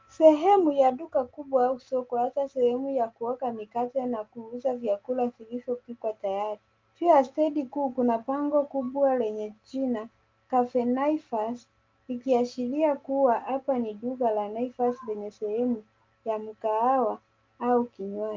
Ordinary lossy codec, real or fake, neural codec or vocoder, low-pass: Opus, 32 kbps; real; none; 7.2 kHz